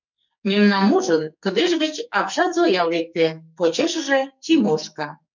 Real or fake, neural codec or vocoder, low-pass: fake; codec, 44.1 kHz, 2.6 kbps, SNAC; 7.2 kHz